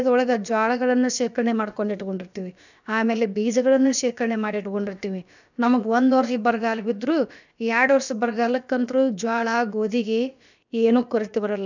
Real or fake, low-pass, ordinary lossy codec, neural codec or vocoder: fake; 7.2 kHz; none; codec, 16 kHz, about 1 kbps, DyCAST, with the encoder's durations